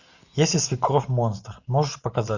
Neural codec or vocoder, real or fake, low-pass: none; real; 7.2 kHz